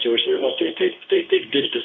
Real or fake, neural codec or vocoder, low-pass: fake; codec, 24 kHz, 0.9 kbps, WavTokenizer, medium speech release version 2; 7.2 kHz